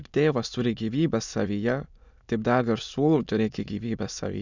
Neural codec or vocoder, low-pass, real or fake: autoencoder, 22.05 kHz, a latent of 192 numbers a frame, VITS, trained on many speakers; 7.2 kHz; fake